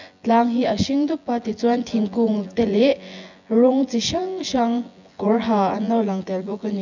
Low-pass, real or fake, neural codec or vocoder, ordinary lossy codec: 7.2 kHz; fake; vocoder, 24 kHz, 100 mel bands, Vocos; none